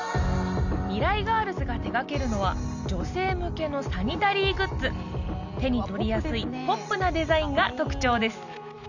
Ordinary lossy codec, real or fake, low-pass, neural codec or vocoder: none; real; 7.2 kHz; none